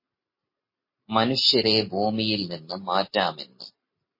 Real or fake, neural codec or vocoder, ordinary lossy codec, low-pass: real; none; MP3, 24 kbps; 5.4 kHz